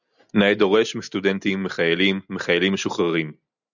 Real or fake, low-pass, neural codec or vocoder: real; 7.2 kHz; none